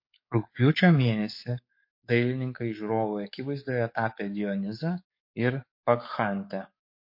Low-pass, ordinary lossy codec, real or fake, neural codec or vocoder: 5.4 kHz; MP3, 32 kbps; fake; codec, 44.1 kHz, 7.8 kbps, DAC